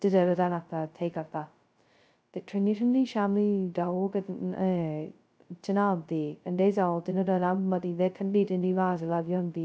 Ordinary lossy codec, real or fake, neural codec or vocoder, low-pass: none; fake; codec, 16 kHz, 0.2 kbps, FocalCodec; none